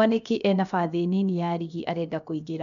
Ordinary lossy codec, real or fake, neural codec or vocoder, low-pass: none; fake; codec, 16 kHz, about 1 kbps, DyCAST, with the encoder's durations; 7.2 kHz